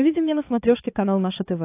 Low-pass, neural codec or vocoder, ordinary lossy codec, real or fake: 3.6 kHz; codec, 16 kHz, 4 kbps, X-Codec, HuBERT features, trained on balanced general audio; AAC, 24 kbps; fake